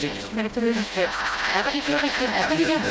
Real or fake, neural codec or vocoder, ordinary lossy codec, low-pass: fake; codec, 16 kHz, 0.5 kbps, FreqCodec, smaller model; none; none